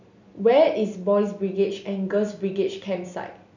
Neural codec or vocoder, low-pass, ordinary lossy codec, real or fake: none; 7.2 kHz; none; real